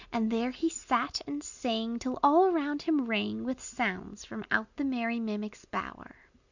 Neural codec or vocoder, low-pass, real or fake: none; 7.2 kHz; real